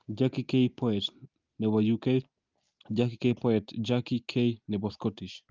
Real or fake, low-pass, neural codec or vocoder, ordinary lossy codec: real; 7.2 kHz; none; Opus, 24 kbps